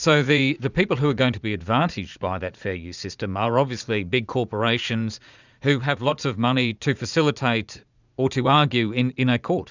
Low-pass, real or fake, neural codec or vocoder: 7.2 kHz; fake; vocoder, 44.1 kHz, 80 mel bands, Vocos